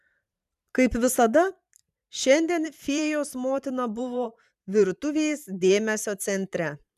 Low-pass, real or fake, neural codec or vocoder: 14.4 kHz; fake; vocoder, 44.1 kHz, 128 mel bands every 512 samples, BigVGAN v2